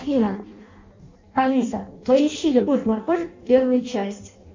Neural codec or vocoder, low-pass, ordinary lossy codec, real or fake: codec, 16 kHz in and 24 kHz out, 0.6 kbps, FireRedTTS-2 codec; 7.2 kHz; MP3, 48 kbps; fake